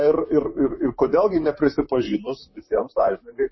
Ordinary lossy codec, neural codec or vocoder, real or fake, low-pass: MP3, 24 kbps; vocoder, 44.1 kHz, 128 mel bands, Pupu-Vocoder; fake; 7.2 kHz